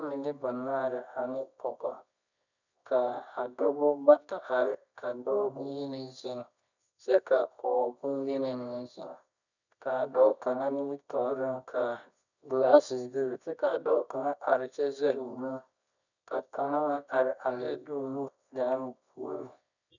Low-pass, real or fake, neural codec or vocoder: 7.2 kHz; fake; codec, 24 kHz, 0.9 kbps, WavTokenizer, medium music audio release